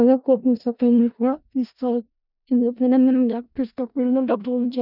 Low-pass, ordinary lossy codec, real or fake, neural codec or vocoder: 5.4 kHz; none; fake; codec, 16 kHz in and 24 kHz out, 0.4 kbps, LongCat-Audio-Codec, four codebook decoder